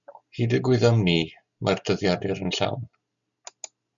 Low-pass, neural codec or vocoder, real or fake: 7.2 kHz; none; real